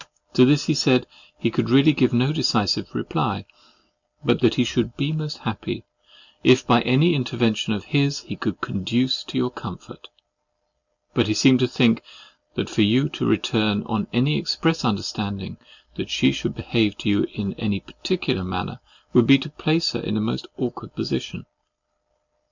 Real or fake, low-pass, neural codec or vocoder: real; 7.2 kHz; none